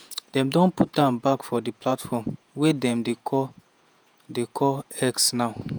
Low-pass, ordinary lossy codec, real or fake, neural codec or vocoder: none; none; fake; vocoder, 48 kHz, 128 mel bands, Vocos